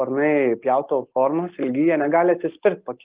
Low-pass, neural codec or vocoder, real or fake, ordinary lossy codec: 3.6 kHz; none; real; Opus, 32 kbps